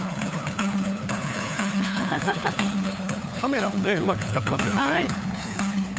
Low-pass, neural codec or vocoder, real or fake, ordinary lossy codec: none; codec, 16 kHz, 4 kbps, FunCodec, trained on LibriTTS, 50 frames a second; fake; none